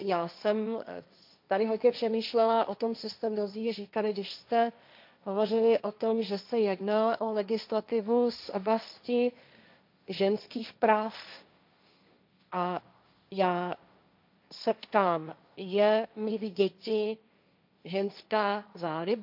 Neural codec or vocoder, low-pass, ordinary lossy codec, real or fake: codec, 16 kHz, 1.1 kbps, Voila-Tokenizer; 5.4 kHz; MP3, 48 kbps; fake